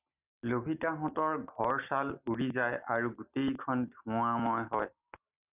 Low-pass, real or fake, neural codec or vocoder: 3.6 kHz; real; none